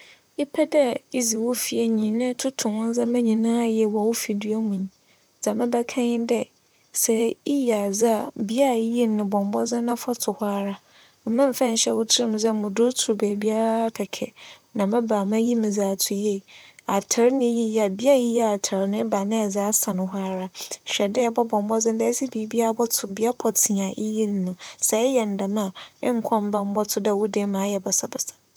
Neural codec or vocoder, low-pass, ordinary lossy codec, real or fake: vocoder, 44.1 kHz, 128 mel bands, Pupu-Vocoder; none; none; fake